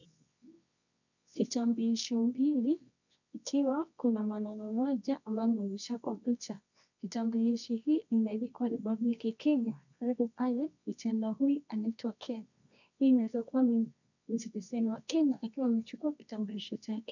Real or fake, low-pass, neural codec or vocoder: fake; 7.2 kHz; codec, 24 kHz, 0.9 kbps, WavTokenizer, medium music audio release